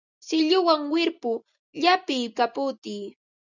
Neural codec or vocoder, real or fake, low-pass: none; real; 7.2 kHz